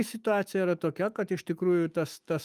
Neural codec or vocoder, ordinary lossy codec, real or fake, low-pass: autoencoder, 48 kHz, 128 numbers a frame, DAC-VAE, trained on Japanese speech; Opus, 32 kbps; fake; 14.4 kHz